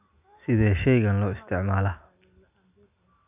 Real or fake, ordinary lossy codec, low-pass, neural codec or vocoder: real; none; 3.6 kHz; none